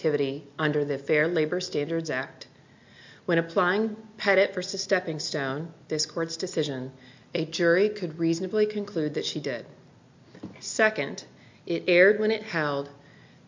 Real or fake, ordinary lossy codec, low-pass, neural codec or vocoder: real; MP3, 48 kbps; 7.2 kHz; none